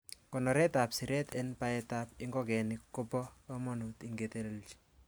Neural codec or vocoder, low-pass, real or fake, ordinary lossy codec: none; none; real; none